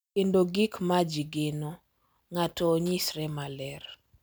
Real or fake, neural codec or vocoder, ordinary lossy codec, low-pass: real; none; none; none